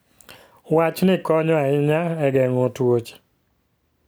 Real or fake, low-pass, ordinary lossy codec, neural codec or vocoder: real; none; none; none